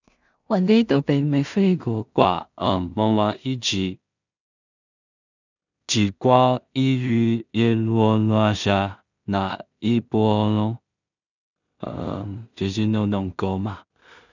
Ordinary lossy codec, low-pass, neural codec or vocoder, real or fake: none; 7.2 kHz; codec, 16 kHz in and 24 kHz out, 0.4 kbps, LongCat-Audio-Codec, two codebook decoder; fake